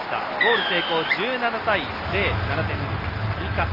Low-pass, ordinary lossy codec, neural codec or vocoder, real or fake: 5.4 kHz; Opus, 32 kbps; none; real